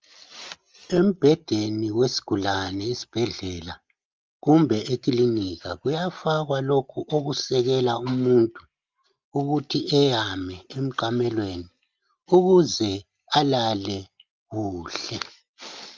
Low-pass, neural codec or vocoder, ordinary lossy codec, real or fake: 7.2 kHz; none; Opus, 24 kbps; real